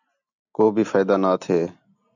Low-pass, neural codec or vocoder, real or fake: 7.2 kHz; none; real